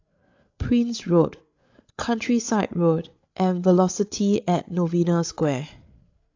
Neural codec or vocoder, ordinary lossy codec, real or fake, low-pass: codec, 16 kHz, 8 kbps, FreqCodec, larger model; AAC, 48 kbps; fake; 7.2 kHz